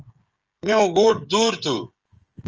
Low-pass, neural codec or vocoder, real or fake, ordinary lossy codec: 7.2 kHz; codec, 16 kHz, 16 kbps, FreqCodec, smaller model; fake; Opus, 24 kbps